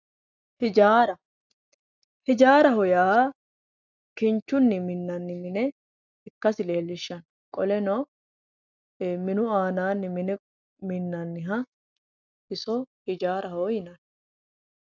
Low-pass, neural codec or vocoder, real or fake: 7.2 kHz; none; real